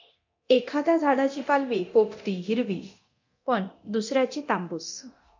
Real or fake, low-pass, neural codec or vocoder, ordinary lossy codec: fake; 7.2 kHz; codec, 24 kHz, 0.9 kbps, DualCodec; MP3, 48 kbps